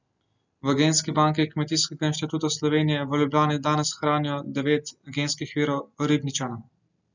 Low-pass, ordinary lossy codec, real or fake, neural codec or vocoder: 7.2 kHz; none; real; none